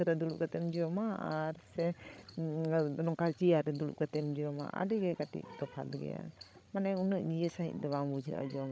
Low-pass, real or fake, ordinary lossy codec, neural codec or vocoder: none; fake; none; codec, 16 kHz, 16 kbps, FreqCodec, larger model